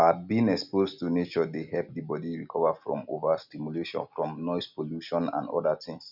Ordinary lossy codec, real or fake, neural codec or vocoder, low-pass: none; real; none; 5.4 kHz